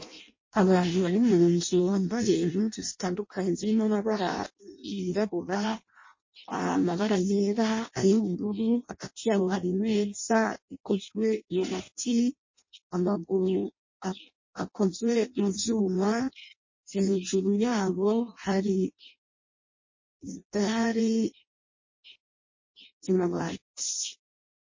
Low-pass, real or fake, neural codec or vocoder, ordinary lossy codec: 7.2 kHz; fake; codec, 16 kHz in and 24 kHz out, 0.6 kbps, FireRedTTS-2 codec; MP3, 32 kbps